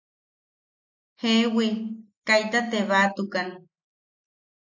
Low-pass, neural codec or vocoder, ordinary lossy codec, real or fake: 7.2 kHz; none; AAC, 48 kbps; real